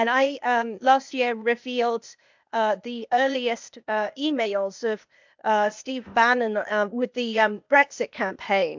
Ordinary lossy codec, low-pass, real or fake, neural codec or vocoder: MP3, 64 kbps; 7.2 kHz; fake; codec, 16 kHz, 0.8 kbps, ZipCodec